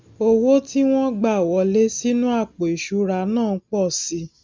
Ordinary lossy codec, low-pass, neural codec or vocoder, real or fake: none; none; none; real